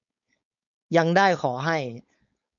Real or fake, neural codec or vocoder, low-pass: fake; codec, 16 kHz, 4.8 kbps, FACodec; 7.2 kHz